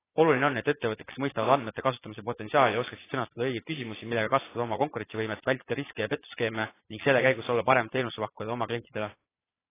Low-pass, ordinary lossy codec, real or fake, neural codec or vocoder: 3.6 kHz; AAC, 16 kbps; fake; vocoder, 22.05 kHz, 80 mel bands, Vocos